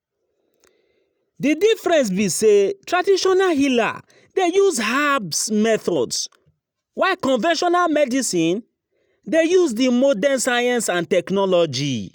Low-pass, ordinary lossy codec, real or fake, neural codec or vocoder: none; none; real; none